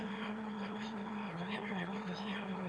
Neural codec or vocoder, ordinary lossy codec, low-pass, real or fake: autoencoder, 22.05 kHz, a latent of 192 numbers a frame, VITS, trained on one speaker; none; none; fake